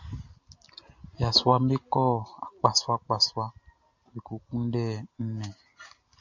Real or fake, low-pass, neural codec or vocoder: real; 7.2 kHz; none